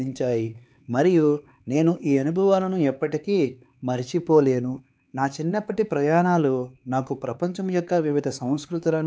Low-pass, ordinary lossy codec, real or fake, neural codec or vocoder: none; none; fake; codec, 16 kHz, 4 kbps, X-Codec, HuBERT features, trained on LibriSpeech